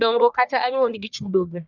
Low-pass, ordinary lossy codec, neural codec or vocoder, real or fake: 7.2 kHz; none; codec, 44.1 kHz, 1.7 kbps, Pupu-Codec; fake